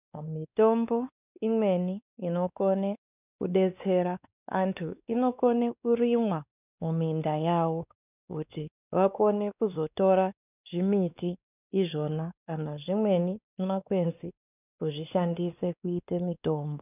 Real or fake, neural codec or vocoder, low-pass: fake; codec, 16 kHz, 2 kbps, X-Codec, WavLM features, trained on Multilingual LibriSpeech; 3.6 kHz